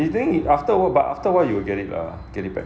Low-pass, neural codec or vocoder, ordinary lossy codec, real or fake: none; none; none; real